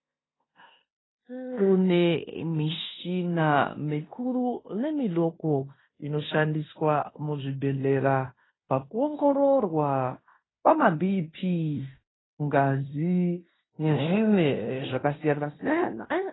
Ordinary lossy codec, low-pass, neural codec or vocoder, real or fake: AAC, 16 kbps; 7.2 kHz; codec, 16 kHz in and 24 kHz out, 0.9 kbps, LongCat-Audio-Codec, fine tuned four codebook decoder; fake